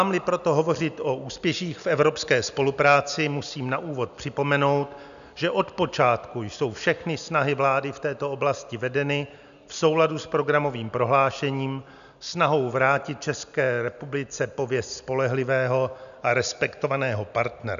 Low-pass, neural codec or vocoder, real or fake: 7.2 kHz; none; real